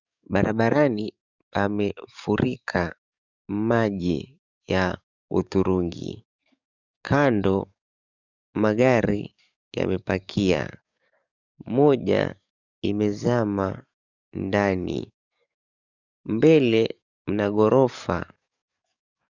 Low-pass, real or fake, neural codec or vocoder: 7.2 kHz; fake; codec, 44.1 kHz, 7.8 kbps, DAC